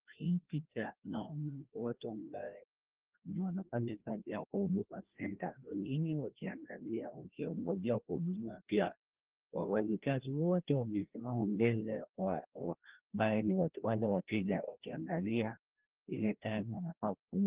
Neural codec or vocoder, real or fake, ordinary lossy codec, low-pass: codec, 16 kHz, 1 kbps, FreqCodec, larger model; fake; Opus, 16 kbps; 3.6 kHz